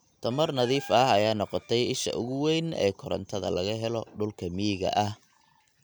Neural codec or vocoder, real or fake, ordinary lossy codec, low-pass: none; real; none; none